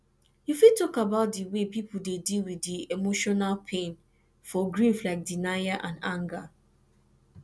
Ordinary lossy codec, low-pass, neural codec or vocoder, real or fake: none; none; none; real